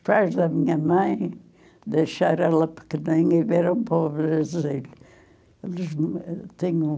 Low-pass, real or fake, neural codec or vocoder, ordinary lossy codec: none; real; none; none